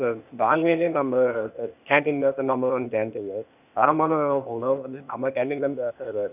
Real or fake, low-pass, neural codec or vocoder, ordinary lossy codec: fake; 3.6 kHz; codec, 16 kHz, 0.8 kbps, ZipCodec; none